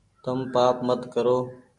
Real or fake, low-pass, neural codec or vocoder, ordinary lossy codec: real; 10.8 kHz; none; MP3, 96 kbps